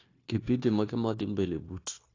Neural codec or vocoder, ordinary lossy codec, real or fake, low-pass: codec, 16 kHz, 0.9 kbps, LongCat-Audio-Codec; AAC, 32 kbps; fake; 7.2 kHz